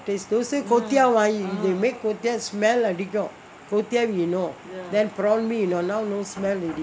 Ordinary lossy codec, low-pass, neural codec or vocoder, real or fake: none; none; none; real